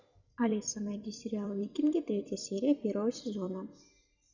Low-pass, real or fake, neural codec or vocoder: 7.2 kHz; fake; vocoder, 44.1 kHz, 128 mel bands every 256 samples, BigVGAN v2